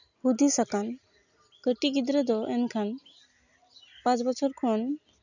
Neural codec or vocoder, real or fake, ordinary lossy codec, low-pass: none; real; none; 7.2 kHz